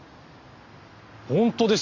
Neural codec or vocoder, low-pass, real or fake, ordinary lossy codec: none; 7.2 kHz; real; MP3, 48 kbps